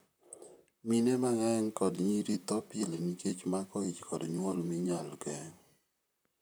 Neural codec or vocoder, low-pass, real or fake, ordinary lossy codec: vocoder, 44.1 kHz, 128 mel bands, Pupu-Vocoder; none; fake; none